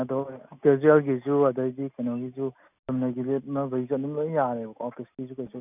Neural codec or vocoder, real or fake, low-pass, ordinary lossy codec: none; real; 3.6 kHz; none